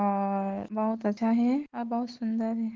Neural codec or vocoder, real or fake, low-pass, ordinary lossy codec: none; real; 7.2 kHz; Opus, 16 kbps